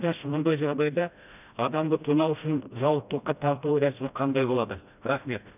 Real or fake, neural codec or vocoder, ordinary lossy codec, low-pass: fake; codec, 16 kHz, 1 kbps, FreqCodec, smaller model; none; 3.6 kHz